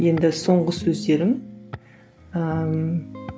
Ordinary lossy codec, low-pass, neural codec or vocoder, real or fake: none; none; none; real